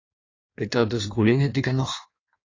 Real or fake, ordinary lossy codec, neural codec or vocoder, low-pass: fake; AAC, 32 kbps; codec, 16 kHz in and 24 kHz out, 1.1 kbps, FireRedTTS-2 codec; 7.2 kHz